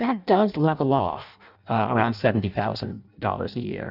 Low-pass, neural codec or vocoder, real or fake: 5.4 kHz; codec, 16 kHz in and 24 kHz out, 0.6 kbps, FireRedTTS-2 codec; fake